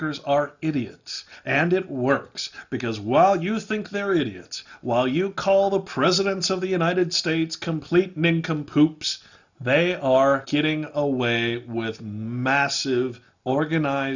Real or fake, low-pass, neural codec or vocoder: real; 7.2 kHz; none